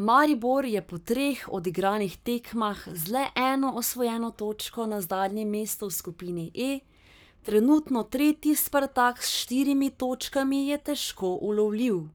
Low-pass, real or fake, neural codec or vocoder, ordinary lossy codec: none; fake; codec, 44.1 kHz, 7.8 kbps, Pupu-Codec; none